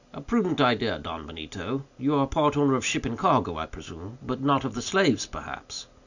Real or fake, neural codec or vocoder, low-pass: real; none; 7.2 kHz